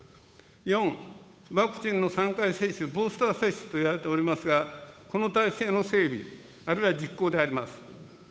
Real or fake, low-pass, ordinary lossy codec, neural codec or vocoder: fake; none; none; codec, 16 kHz, 8 kbps, FunCodec, trained on Chinese and English, 25 frames a second